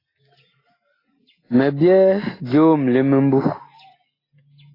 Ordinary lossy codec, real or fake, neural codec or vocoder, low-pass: AAC, 24 kbps; real; none; 5.4 kHz